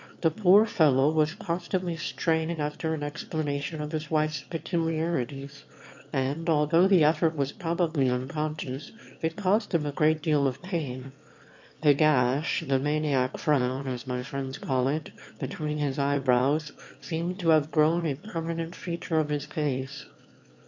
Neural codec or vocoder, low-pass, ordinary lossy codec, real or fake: autoencoder, 22.05 kHz, a latent of 192 numbers a frame, VITS, trained on one speaker; 7.2 kHz; MP3, 48 kbps; fake